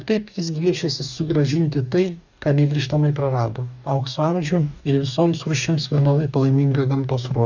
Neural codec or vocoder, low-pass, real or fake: codec, 44.1 kHz, 2.6 kbps, DAC; 7.2 kHz; fake